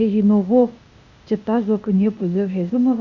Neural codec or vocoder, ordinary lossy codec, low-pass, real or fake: codec, 16 kHz, 0.8 kbps, ZipCodec; none; 7.2 kHz; fake